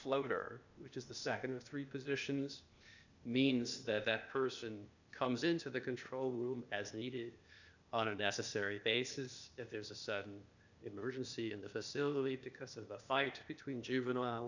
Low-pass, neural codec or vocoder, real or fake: 7.2 kHz; codec, 16 kHz, 0.8 kbps, ZipCodec; fake